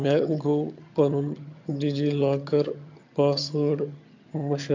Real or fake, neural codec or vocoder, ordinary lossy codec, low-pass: fake; vocoder, 22.05 kHz, 80 mel bands, HiFi-GAN; MP3, 48 kbps; 7.2 kHz